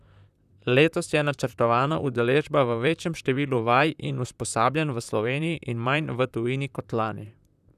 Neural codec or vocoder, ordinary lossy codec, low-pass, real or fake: vocoder, 44.1 kHz, 128 mel bands, Pupu-Vocoder; none; 14.4 kHz; fake